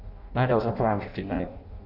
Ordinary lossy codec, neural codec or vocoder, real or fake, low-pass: none; codec, 16 kHz in and 24 kHz out, 0.6 kbps, FireRedTTS-2 codec; fake; 5.4 kHz